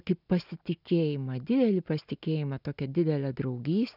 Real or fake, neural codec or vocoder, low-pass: real; none; 5.4 kHz